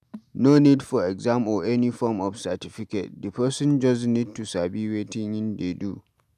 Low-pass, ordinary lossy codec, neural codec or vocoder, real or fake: 14.4 kHz; none; none; real